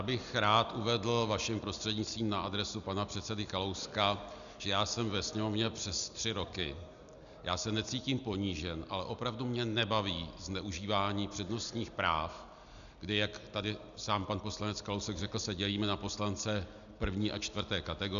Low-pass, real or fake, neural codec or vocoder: 7.2 kHz; real; none